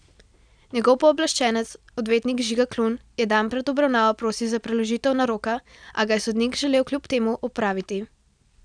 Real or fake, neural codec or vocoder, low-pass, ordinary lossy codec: real; none; 9.9 kHz; none